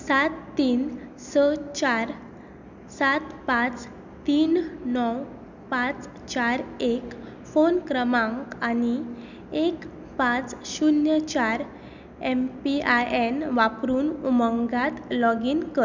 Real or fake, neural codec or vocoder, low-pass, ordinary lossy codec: real; none; 7.2 kHz; none